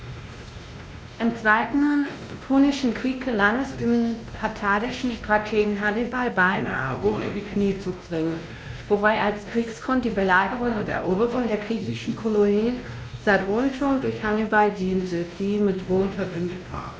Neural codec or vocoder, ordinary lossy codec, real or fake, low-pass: codec, 16 kHz, 1 kbps, X-Codec, WavLM features, trained on Multilingual LibriSpeech; none; fake; none